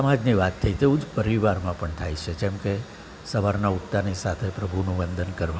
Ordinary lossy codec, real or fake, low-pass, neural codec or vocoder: none; real; none; none